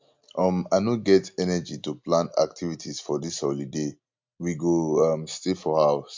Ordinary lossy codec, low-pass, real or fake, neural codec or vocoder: MP3, 48 kbps; 7.2 kHz; real; none